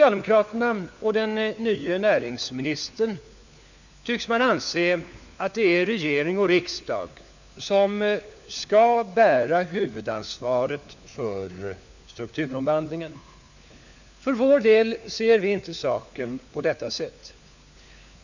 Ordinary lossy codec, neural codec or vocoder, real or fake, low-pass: none; codec, 16 kHz, 4 kbps, FunCodec, trained on LibriTTS, 50 frames a second; fake; 7.2 kHz